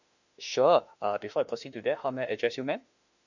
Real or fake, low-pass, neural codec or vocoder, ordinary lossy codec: fake; 7.2 kHz; autoencoder, 48 kHz, 32 numbers a frame, DAC-VAE, trained on Japanese speech; none